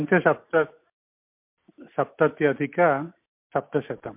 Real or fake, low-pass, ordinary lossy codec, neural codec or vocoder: real; 3.6 kHz; MP3, 32 kbps; none